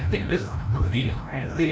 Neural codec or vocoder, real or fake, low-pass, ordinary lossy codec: codec, 16 kHz, 0.5 kbps, FreqCodec, larger model; fake; none; none